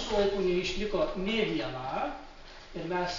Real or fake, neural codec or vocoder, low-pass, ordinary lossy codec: real; none; 7.2 kHz; MP3, 48 kbps